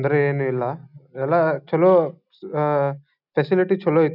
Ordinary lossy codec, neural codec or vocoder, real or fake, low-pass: none; none; real; 5.4 kHz